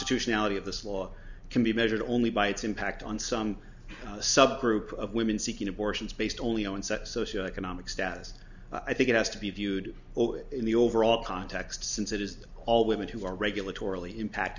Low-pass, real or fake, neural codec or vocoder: 7.2 kHz; real; none